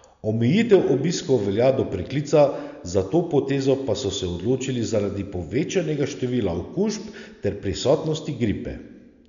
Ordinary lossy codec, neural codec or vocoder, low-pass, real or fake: none; none; 7.2 kHz; real